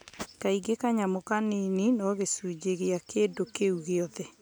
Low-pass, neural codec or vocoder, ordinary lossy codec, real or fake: none; none; none; real